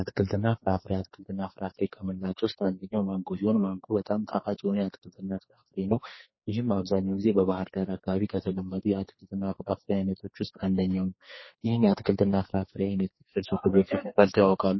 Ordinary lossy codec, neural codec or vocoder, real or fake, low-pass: MP3, 24 kbps; codec, 44.1 kHz, 2.6 kbps, SNAC; fake; 7.2 kHz